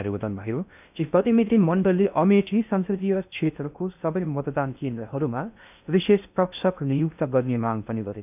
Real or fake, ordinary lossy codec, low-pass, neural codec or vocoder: fake; none; 3.6 kHz; codec, 16 kHz in and 24 kHz out, 0.6 kbps, FocalCodec, streaming, 2048 codes